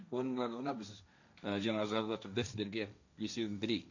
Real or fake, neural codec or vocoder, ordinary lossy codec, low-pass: fake; codec, 16 kHz, 1.1 kbps, Voila-Tokenizer; none; 7.2 kHz